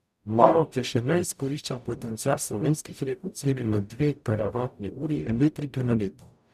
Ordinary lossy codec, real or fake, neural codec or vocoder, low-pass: none; fake; codec, 44.1 kHz, 0.9 kbps, DAC; 14.4 kHz